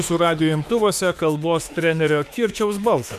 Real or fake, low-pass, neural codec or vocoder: fake; 14.4 kHz; autoencoder, 48 kHz, 32 numbers a frame, DAC-VAE, trained on Japanese speech